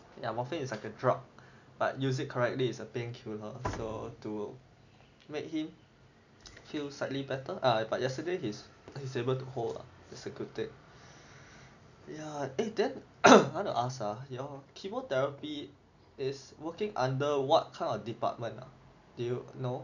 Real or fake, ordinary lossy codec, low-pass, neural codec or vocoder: real; none; 7.2 kHz; none